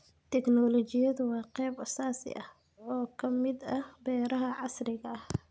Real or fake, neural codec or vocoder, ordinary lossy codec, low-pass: real; none; none; none